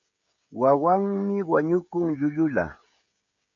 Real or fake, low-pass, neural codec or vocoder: fake; 7.2 kHz; codec, 16 kHz, 16 kbps, FreqCodec, smaller model